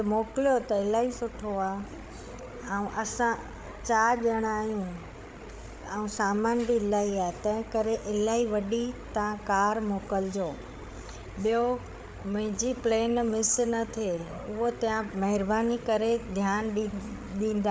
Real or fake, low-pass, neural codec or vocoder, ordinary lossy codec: fake; none; codec, 16 kHz, 8 kbps, FreqCodec, larger model; none